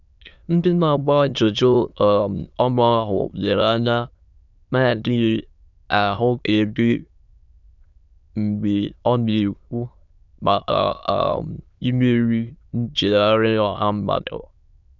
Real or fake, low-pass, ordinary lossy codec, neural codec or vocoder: fake; 7.2 kHz; none; autoencoder, 22.05 kHz, a latent of 192 numbers a frame, VITS, trained on many speakers